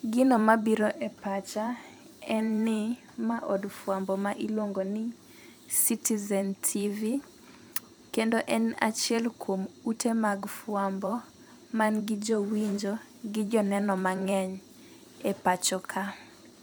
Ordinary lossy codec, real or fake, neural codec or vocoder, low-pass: none; fake; vocoder, 44.1 kHz, 128 mel bands every 512 samples, BigVGAN v2; none